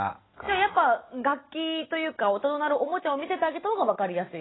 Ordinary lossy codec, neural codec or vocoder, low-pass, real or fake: AAC, 16 kbps; none; 7.2 kHz; real